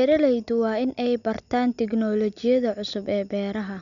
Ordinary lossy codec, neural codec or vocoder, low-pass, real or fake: none; none; 7.2 kHz; real